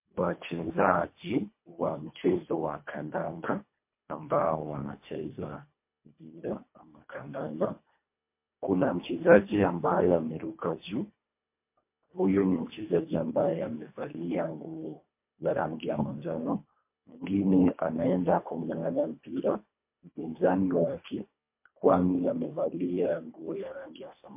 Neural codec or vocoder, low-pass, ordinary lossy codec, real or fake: codec, 24 kHz, 1.5 kbps, HILCodec; 3.6 kHz; MP3, 24 kbps; fake